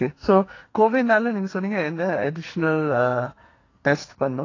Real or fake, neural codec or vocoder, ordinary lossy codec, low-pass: fake; codec, 44.1 kHz, 2.6 kbps, SNAC; AAC, 32 kbps; 7.2 kHz